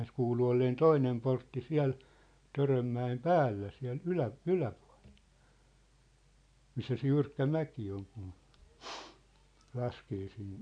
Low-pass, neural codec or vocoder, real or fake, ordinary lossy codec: 9.9 kHz; none; real; none